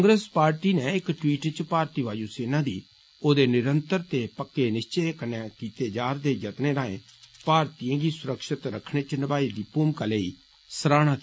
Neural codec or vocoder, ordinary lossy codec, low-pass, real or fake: none; none; none; real